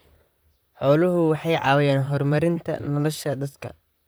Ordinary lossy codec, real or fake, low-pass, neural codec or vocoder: none; fake; none; vocoder, 44.1 kHz, 128 mel bands, Pupu-Vocoder